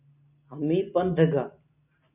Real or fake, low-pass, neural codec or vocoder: real; 3.6 kHz; none